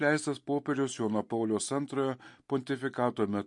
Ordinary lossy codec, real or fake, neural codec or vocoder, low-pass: MP3, 48 kbps; real; none; 10.8 kHz